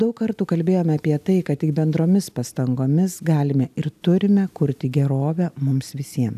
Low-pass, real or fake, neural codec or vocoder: 14.4 kHz; real; none